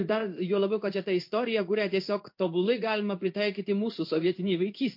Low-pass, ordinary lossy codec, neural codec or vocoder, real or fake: 5.4 kHz; MP3, 32 kbps; codec, 16 kHz in and 24 kHz out, 1 kbps, XY-Tokenizer; fake